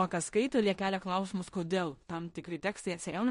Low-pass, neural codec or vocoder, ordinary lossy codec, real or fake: 10.8 kHz; codec, 16 kHz in and 24 kHz out, 0.9 kbps, LongCat-Audio-Codec, four codebook decoder; MP3, 48 kbps; fake